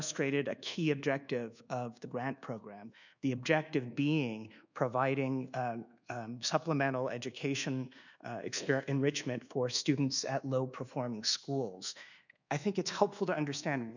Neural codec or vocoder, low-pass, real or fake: codec, 24 kHz, 1.2 kbps, DualCodec; 7.2 kHz; fake